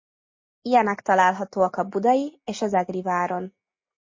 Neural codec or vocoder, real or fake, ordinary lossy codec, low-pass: none; real; MP3, 32 kbps; 7.2 kHz